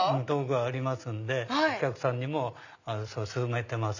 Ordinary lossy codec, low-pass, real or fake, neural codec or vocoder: none; 7.2 kHz; real; none